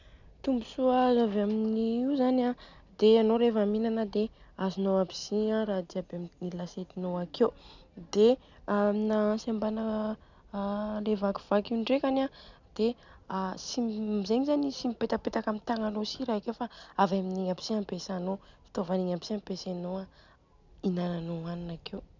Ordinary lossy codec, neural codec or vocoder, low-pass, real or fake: none; none; 7.2 kHz; real